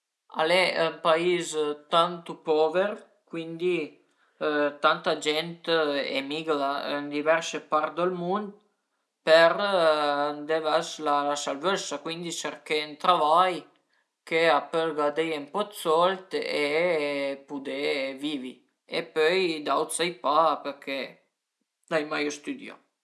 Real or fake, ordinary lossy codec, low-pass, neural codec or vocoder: real; none; none; none